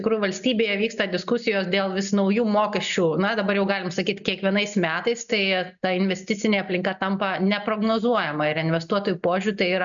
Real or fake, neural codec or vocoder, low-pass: real; none; 7.2 kHz